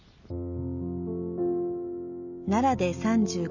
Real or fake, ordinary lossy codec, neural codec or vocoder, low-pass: real; none; none; 7.2 kHz